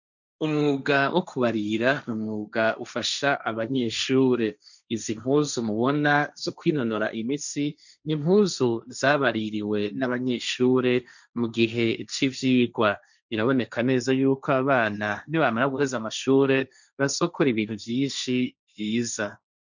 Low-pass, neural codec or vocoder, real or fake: 7.2 kHz; codec, 16 kHz, 1.1 kbps, Voila-Tokenizer; fake